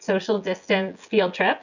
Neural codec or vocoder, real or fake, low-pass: vocoder, 24 kHz, 100 mel bands, Vocos; fake; 7.2 kHz